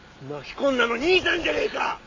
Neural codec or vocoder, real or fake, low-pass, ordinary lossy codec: none; real; 7.2 kHz; MP3, 48 kbps